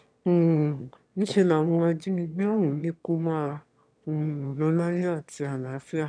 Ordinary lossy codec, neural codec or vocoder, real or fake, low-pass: none; autoencoder, 22.05 kHz, a latent of 192 numbers a frame, VITS, trained on one speaker; fake; 9.9 kHz